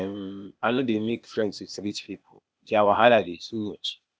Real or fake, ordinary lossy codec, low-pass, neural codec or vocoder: fake; none; none; codec, 16 kHz, 0.8 kbps, ZipCodec